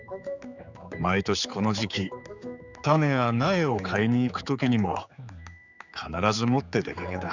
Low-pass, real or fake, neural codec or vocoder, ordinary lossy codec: 7.2 kHz; fake; codec, 16 kHz, 4 kbps, X-Codec, HuBERT features, trained on general audio; none